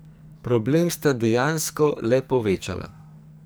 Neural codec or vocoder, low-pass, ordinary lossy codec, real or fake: codec, 44.1 kHz, 2.6 kbps, SNAC; none; none; fake